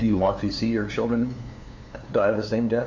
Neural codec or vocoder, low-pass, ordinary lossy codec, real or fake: codec, 16 kHz, 2 kbps, FunCodec, trained on LibriTTS, 25 frames a second; 7.2 kHz; MP3, 48 kbps; fake